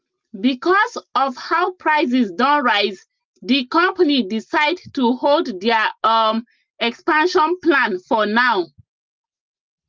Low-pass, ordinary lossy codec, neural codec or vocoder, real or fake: 7.2 kHz; Opus, 32 kbps; none; real